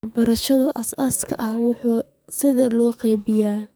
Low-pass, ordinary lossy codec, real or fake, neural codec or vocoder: none; none; fake; codec, 44.1 kHz, 2.6 kbps, SNAC